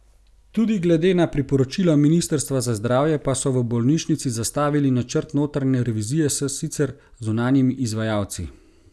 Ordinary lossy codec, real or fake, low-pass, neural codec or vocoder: none; real; none; none